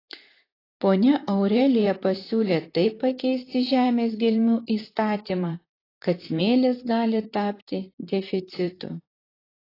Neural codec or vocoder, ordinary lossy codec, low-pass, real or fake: vocoder, 44.1 kHz, 128 mel bands, Pupu-Vocoder; AAC, 24 kbps; 5.4 kHz; fake